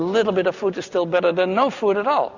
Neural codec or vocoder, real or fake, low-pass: none; real; 7.2 kHz